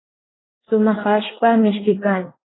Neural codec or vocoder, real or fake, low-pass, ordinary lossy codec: codec, 24 kHz, 1 kbps, SNAC; fake; 7.2 kHz; AAC, 16 kbps